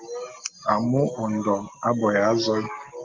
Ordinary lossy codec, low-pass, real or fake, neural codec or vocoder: Opus, 32 kbps; 7.2 kHz; real; none